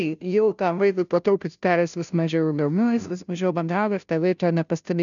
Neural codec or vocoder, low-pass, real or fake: codec, 16 kHz, 0.5 kbps, FunCodec, trained on Chinese and English, 25 frames a second; 7.2 kHz; fake